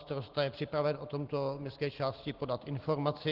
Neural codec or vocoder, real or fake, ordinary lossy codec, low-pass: none; real; Opus, 16 kbps; 5.4 kHz